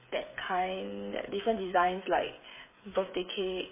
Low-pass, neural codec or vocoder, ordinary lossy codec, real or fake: 3.6 kHz; codec, 16 kHz, 8 kbps, FreqCodec, smaller model; MP3, 16 kbps; fake